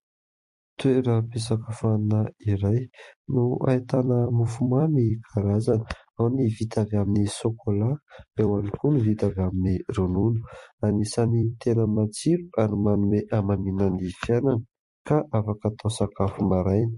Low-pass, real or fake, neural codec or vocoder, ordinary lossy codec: 14.4 kHz; fake; vocoder, 44.1 kHz, 128 mel bands every 256 samples, BigVGAN v2; MP3, 48 kbps